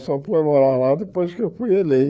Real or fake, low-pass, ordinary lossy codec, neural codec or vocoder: fake; none; none; codec, 16 kHz, 4 kbps, FreqCodec, larger model